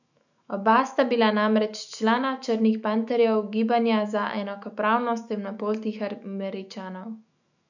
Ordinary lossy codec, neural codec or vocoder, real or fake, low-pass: none; autoencoder, 48 kHz, 128 numbers a frame, DAC-VAE, trained on Japanese speech; fake; 7.2 kHz